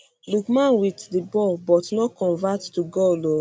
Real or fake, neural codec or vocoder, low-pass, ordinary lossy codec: real; none; none; none